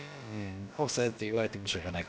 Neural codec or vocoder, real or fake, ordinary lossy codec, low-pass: codec, 16 kHz, about 1 kbps, DyCAST, with the encoder's durations; fake; none; none